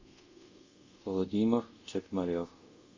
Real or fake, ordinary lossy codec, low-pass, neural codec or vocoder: fake; MP3, 32 kbps; 7.2 kHz; codec, 24 kHz, 0.5 kbps, DualCodec